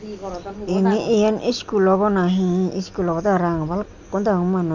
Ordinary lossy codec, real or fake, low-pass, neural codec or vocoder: none; real; 7.2 kHz; none